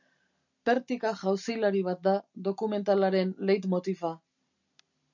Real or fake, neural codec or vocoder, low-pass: real; none; 7.2 kHz